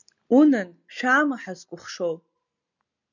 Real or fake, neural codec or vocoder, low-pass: real; none; 7.2 kHz